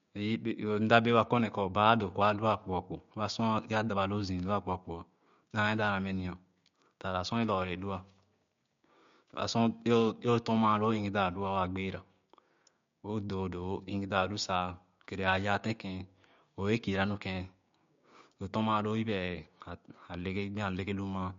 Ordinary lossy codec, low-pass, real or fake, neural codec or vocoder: MP3, 48 kbps; 7.2 kHz; fake; codec, 16 kHz, 6 kbps, DAC